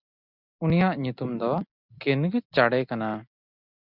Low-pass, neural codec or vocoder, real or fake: 5.4 kHz; none; real